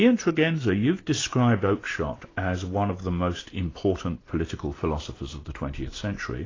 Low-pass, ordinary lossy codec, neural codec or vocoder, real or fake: 7.2 kHz; AAC, 32 kbps; none; real